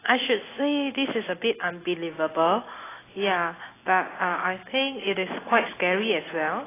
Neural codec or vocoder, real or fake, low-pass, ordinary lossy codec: none; real; 3.6 kHz; AAC, 16 kbps